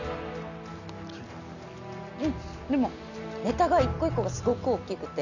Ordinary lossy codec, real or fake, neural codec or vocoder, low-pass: none; real; none; 7.2 kHz